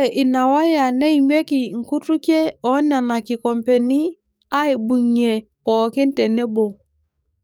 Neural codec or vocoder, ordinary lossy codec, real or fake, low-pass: codec, 44.1 kHz, 7.8 kbps, DAC; none; fake; none